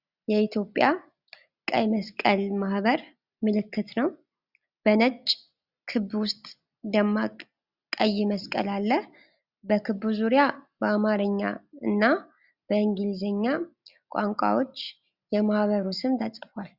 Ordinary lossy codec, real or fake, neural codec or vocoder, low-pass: Opus, 64 kbps; real; none; 5.4 kHz